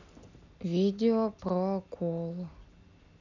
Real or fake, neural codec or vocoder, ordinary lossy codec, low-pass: real; none; none; 7.2 kHz